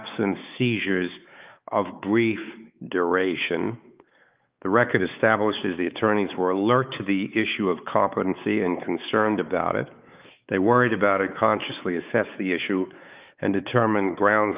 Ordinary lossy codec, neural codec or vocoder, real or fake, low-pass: Opus, 24 kbps; codec, 16 kHz, 4 kbps, X-Codec, WavLM features, trained on Multilingual LibriSpeech; fake; 3.6 kHz